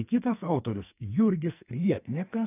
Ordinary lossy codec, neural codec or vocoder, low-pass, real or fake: AAC, 24 kbps; codec, 44.1 kHz, 2.6 kbps, SNAC; 3.6 kHz; fake